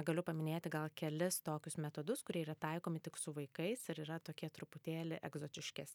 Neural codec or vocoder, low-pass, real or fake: none; 19.8 kHz; real